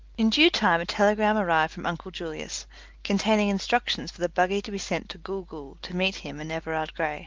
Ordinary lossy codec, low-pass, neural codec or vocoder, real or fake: Opus, 24 kbps; 7.2 kHz; none; real